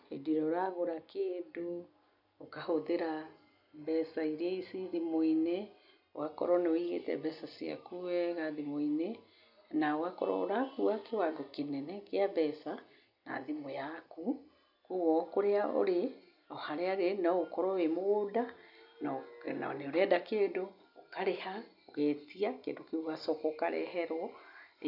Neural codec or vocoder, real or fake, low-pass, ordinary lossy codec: none; real; 5.4 kHz; none